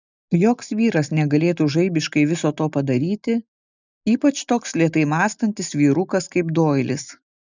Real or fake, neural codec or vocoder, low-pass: real; none; 7.2 kHz